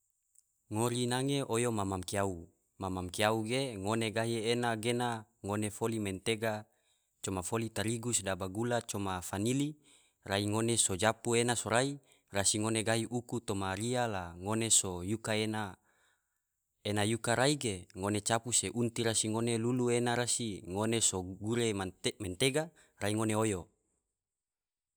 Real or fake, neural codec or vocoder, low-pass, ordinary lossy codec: real; none; none; none